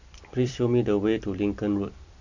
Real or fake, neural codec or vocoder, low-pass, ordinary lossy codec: fake; vocoder, 44.1 kHz, 128 mel bands every 256 samples, BigVGAN v2; 7.2 kHz; none